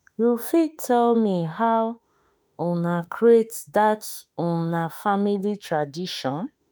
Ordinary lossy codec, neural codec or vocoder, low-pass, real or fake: none; autoencoder, 48 kHz, 32 numbers a frame, DAC-VAE, trained on Japanese speech; none; fake